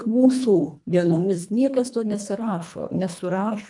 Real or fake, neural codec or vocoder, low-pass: fake; codec, 24 kHz, 1.5 kbps, HILCodec; 10.8 kHz